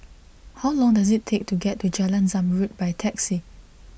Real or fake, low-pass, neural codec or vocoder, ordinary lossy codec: real; none; none; none